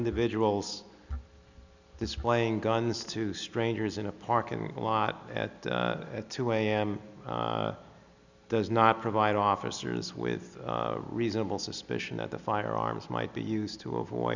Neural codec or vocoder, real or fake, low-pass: none; real; 7.2 kHz